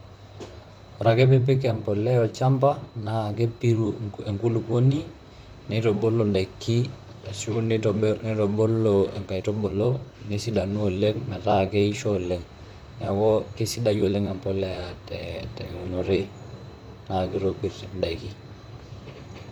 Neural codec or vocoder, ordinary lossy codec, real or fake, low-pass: vocoder, 44.1 kHz, 128 mel bands, Pupu-Vocoder; none; fake; 19.8 kHz